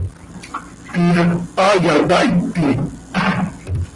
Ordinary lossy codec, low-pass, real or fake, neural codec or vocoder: Opus, 24 kbps; 10.8 kHz; real; none